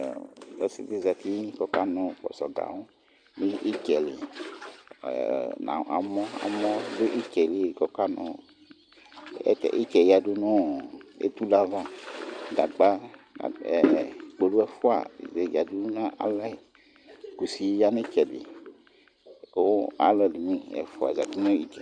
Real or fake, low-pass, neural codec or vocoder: real; 9.9 kHz; none